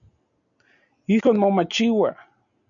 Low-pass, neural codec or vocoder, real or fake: 7.2 kHz; none; real